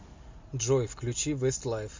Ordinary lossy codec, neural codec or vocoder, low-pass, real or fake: MP3, 48 kbps; none; 7.2 kHz; real